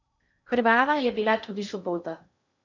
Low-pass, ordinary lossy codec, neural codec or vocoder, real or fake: 7.2 kHz; MP3, 64 kbps; codec, 16 kHz in and 24 kHz out, 0.6 kbps, FocalCodec, streaming, 2048 codes; fake